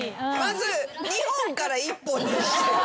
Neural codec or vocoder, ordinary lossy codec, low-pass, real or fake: none; none; none; real